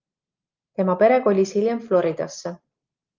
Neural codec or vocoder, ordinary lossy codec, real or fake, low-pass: none; Opus, 32 kbps; real; 7.2 kHz